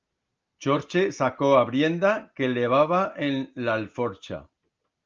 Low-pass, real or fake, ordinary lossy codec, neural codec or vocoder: 7.2 kHz; real; Opus, 24 kbps; none